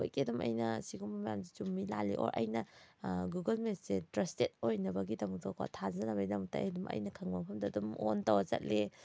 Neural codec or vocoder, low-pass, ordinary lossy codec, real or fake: none; none; none; real